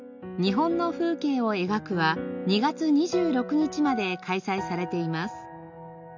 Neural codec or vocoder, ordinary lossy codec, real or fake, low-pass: none; none; real; 7.2 kHz